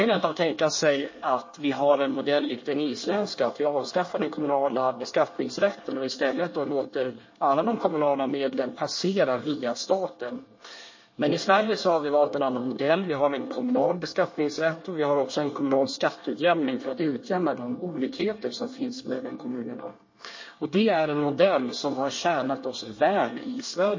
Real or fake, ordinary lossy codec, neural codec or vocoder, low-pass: fake; MP3, 32 kbps; codec, 24 kHz, 1 kbps, SNAC; 7.2 kHz